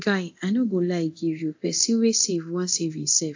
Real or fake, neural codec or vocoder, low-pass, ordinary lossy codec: fake; codec, 24 kHz, 0.9 kbps, DualCodec; 7.2 kHz; AAC, 48 kbps